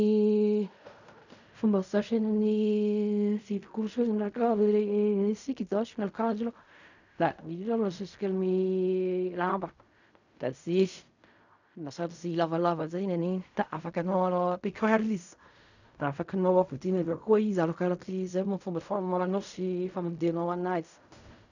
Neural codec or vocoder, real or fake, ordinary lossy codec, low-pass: codec, 16 kHz in and 24 kHz out, 0.4 kbps, LongCat-Audio-Codec, fine tuned four codebook decoder; fake; none; 7.2 kHz